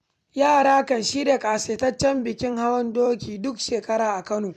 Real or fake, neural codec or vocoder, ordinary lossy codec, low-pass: fake; vocoder, 44.1 kHz, 128 mel bands every 256 samples, BigVGAN v2; MP3, 96 kbps; 14.4 kHz